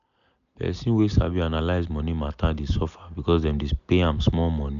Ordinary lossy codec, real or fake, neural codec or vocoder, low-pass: none; real; none; 7.2 kHz